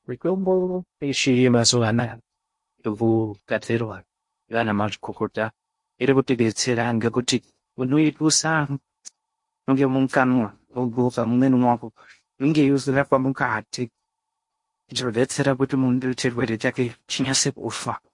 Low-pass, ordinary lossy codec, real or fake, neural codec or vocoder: 10.8 kHz; MP3, 48 kbps; fake; codec, 16 kHz in and 24 kHz out, 0.6 kbps, FocalCodec, streaming, 2048 codes